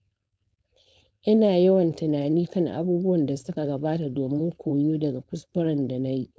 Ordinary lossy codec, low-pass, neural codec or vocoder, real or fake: none; none; codec, 16 kHz, 4.8 kbps, FACodec; fake